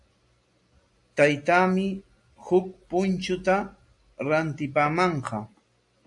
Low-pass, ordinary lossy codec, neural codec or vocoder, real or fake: 10.8 kHz; AAC, 64 kbps; none; real